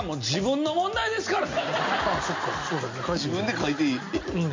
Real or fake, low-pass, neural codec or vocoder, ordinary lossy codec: real; 7.2 kHz; none; MP3, 48 kbps